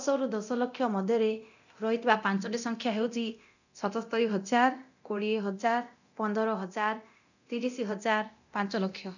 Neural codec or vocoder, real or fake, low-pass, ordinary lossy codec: codec, 24 kHz, 0.9 kbps, DualCodec; fake; 7.2 kHz; none